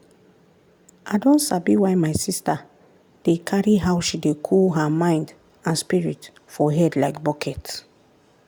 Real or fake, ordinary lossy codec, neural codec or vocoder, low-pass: real; none; none; none